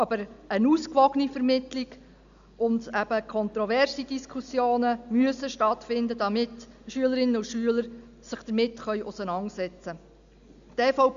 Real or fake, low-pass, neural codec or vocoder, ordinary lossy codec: real; 7.2 kHz; none; AAC, 96 kbps